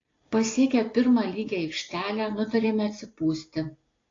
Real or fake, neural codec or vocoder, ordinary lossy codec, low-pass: real; none; AAC, 32 kbps; 7.2 kHz